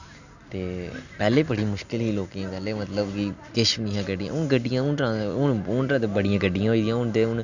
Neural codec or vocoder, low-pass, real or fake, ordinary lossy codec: none; 7.2 kHz; real; none